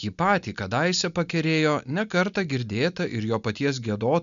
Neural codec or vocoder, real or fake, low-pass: none; real; 7.2 kHz